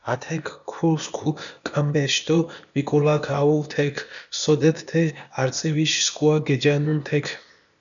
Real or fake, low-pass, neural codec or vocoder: fake; 7.2 kHz; codec, 16 kHz, 0.8 kbps, ZipCodec